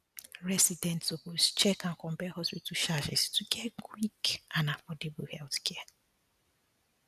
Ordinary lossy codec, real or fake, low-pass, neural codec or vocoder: none; real; 14.4 kHz; none